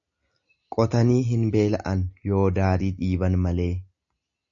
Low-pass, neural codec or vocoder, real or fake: 7.2 kHz; none; real